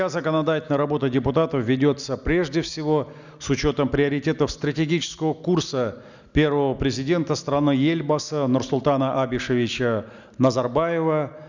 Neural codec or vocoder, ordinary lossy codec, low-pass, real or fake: none; none; 7.2 kHz; real